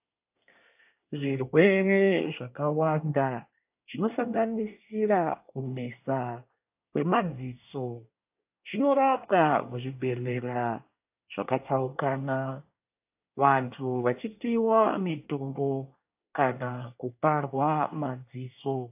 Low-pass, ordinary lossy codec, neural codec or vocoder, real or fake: 3.6 kHz; AAC, 32 kbps; codec, 24 kHz, 1 kbps, SNAC; fake